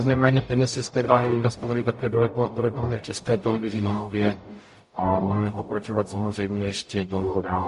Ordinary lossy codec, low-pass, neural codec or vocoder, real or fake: MP3, 48 kbps; 14.4 kHz; codec, 44.1 kHz, 0.9 kbps, DAC; fake